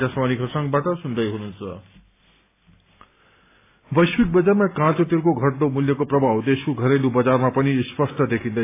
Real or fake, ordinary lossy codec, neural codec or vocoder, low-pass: real; AAC, 24 kbps; none; 3.6 kHz